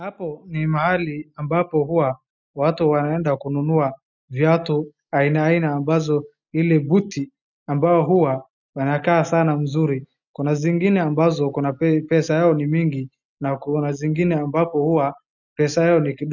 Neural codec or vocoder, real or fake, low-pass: none; real; 7.2 kHz